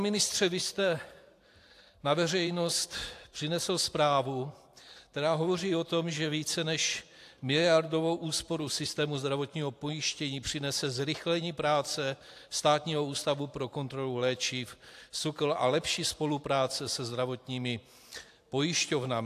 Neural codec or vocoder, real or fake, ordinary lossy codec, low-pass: vocoder, 44.1 kHz, 128 mel bands every 512 samples, BigVGAN v2; fake; AAC, 64 kbps; 14.4 kHz